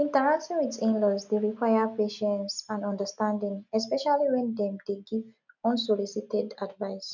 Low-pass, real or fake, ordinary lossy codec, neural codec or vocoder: 7.2 kHz; real; none; none